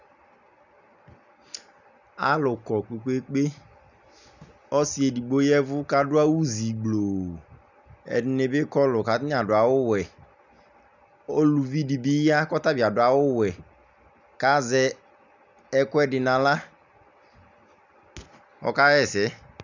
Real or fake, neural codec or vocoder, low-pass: real; none; 7.2 kHz